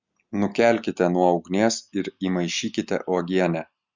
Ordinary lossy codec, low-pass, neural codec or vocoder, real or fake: Opus, 64 kbps; 7.2 kHz; none; real